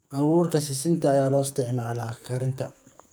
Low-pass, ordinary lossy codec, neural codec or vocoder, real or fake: none; none; codec, 44.1 kHz, 2.6 kbps, SNAC; fake